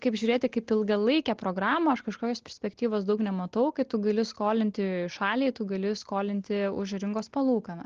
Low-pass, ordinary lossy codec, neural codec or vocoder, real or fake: 7.2 kHz; Opus, 16 kbps; none; real